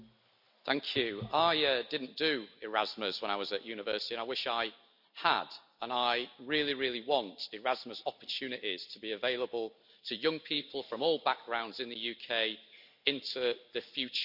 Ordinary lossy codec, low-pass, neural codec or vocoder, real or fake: none; 5.4 kHz; none; real